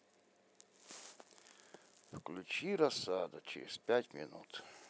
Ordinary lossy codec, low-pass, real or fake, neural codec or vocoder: none; none; real; none